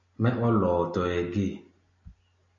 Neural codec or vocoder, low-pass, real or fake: none; 7.2 kHz; real